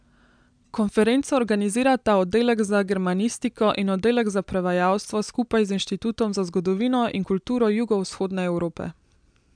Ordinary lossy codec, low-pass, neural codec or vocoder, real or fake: MP3, 96 kbps; 9.9 kHz; none; real